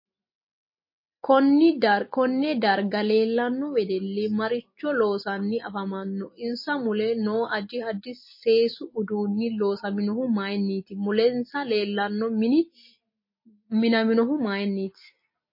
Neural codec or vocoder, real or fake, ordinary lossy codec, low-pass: none; real; MP3, 24 kbps; 5.4 kHz